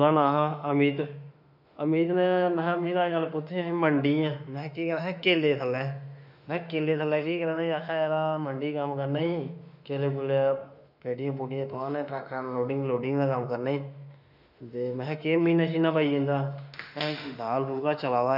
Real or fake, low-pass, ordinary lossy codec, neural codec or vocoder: fake; 5.4 kHz; none; autoencoder, 48 kHz, 32 numbers a frame, DAC-VAE, trained on Japanese speech